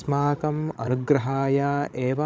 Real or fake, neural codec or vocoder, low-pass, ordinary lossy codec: fake; codec, 16 kHz, 16 kbps, FreqCodec, larger model; none; none